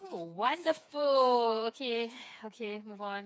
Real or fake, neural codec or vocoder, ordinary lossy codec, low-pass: fake; codec, 16 kHz, 4 kbps, FreqCodec, smaller model; none; none